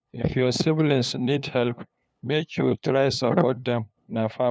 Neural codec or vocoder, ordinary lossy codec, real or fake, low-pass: codec, 16 kHz, 2 kbps, FunCodec, trained on LibriTTS, 25 frames a second; none; fake; none